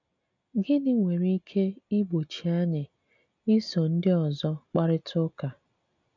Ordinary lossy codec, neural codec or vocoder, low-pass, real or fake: none; none; 7.2 kHz; real